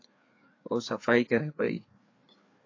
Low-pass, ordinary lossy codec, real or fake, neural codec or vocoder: 7.2 kHz; AAC, 32 kbps; fake; codec, 16 kHz, 4 kbps, FreqCodec, larger model